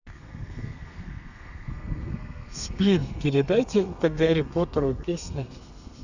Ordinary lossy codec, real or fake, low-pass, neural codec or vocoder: none; fake; 7.2 kHz; codec, 32 kHz, 1.9 kbps, SNAC